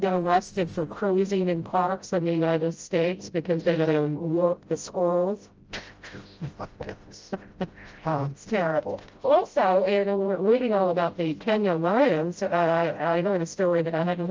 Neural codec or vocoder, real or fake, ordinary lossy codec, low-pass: codec, 16 kHz, 0.5 kbps, FreqCodec, smaller model; fake; Opus, 24 kbps; 7.2 kHz